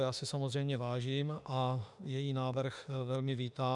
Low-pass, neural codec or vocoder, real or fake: 10.8 kHz; autoencoder, 48 kHz, 32 numbers a frame, DAC-VAE, trained on Japanese speech; fake